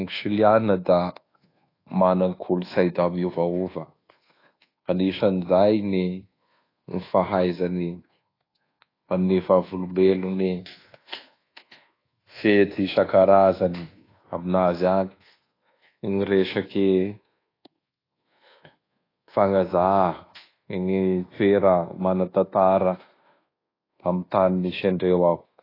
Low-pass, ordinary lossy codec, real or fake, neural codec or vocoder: 5.4 kHz; AAC, 24 kbps; fake; codec, 24 kHz, 1.2 kbps, DualCodec